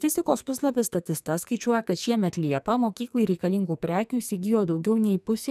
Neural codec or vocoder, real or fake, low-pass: codec, 44.1 kHz, 2.6 kbps, SNAC; fake; 14.4 kHz